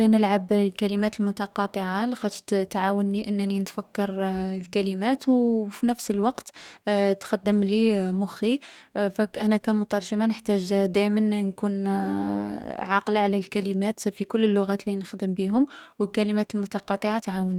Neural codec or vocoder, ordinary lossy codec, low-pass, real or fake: codec, 44.1 kHz, 2.6 kbps, DAC; none; 19.8 kHz; fake